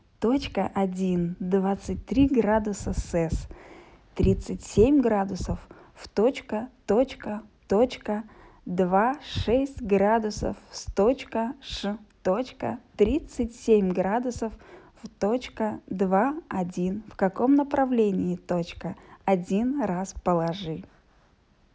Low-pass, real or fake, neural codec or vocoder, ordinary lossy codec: none; real; none; none